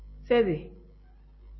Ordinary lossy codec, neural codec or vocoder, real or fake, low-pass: MP3, 24 kbps; none; real; 7.2 kHz